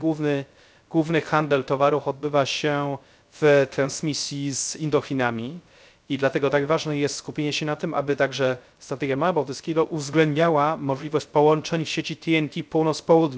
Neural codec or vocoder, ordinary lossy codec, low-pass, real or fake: codec, 16 kHz, 0.3 kbps, FocalCodec; none; none; fake